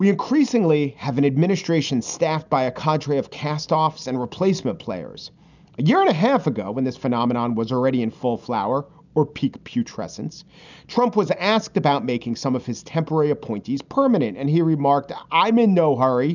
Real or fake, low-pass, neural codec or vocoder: real; 7.2 kHz; none